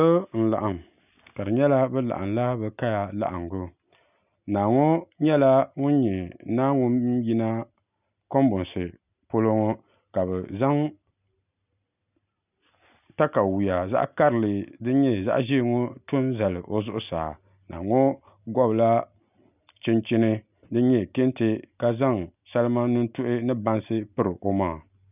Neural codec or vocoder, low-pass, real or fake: none; 3.6 kHz; real